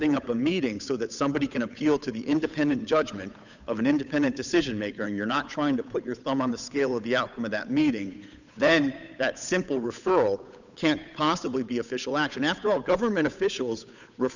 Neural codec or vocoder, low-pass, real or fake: codec, 16 kHz, 8 kbps, FunCodec, trained on Chinese and English, 25 frames a second; 7.2 kHz; fake